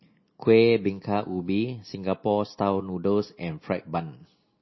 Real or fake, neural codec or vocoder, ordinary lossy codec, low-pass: real; none; MP3, 24 kbps; 7.2 kHz